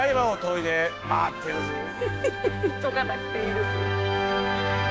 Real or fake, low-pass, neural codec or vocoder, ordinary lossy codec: fake; none; codec, 16 kHz, 6 kbps, DAC; none